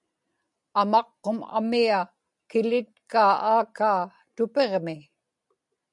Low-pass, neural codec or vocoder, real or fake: 10.8 kHz; none; real